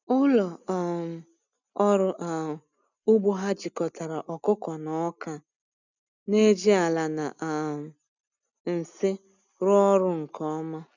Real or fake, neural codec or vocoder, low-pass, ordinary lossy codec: real; none; 7.2 kHz; none